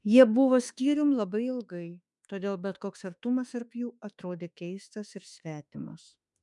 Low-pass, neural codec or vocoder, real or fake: 10.8 kHz; autoencoder, 48 kHz, 32 numbers a frame, DAC-VAE, trained on Japanese speech; fake